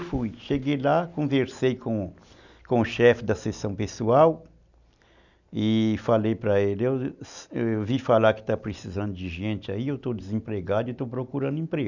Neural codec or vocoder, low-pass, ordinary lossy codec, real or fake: none; 7.2 kHz; none; real